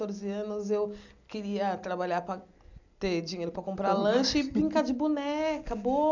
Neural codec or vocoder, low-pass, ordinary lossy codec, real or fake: none; 7.2 kHz; none; real